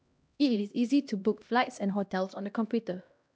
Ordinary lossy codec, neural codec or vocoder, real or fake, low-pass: none; codec, 16 kHz, 1 kbps, X-Codec, HuBERT features, trained on LibriSpeech; fake; none